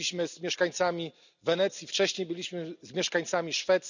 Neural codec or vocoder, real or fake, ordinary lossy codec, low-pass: none; real; none; 7.2 kHz